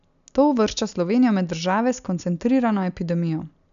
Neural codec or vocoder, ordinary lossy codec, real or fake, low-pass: none; none; real; 7.2 kHz